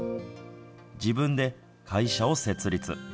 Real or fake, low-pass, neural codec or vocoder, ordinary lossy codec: real; none; none; none